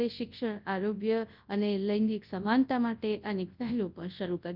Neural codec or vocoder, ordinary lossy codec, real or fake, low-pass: codec, 24 kHz, 0.9 kbps, WavTokenizer, large speech release; Opus, 24 kbps; fake; 5.4 kHz